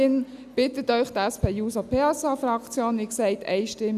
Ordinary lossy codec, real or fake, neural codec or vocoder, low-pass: none; real; none; 14.4 kHz